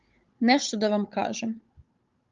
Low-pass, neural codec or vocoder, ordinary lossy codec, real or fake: 7.2 kHz; codec, 16 kHz, 16 kbps, FunCodec, trained on Chinese and English, 50 frames a second; Opus, 32 kbps; fake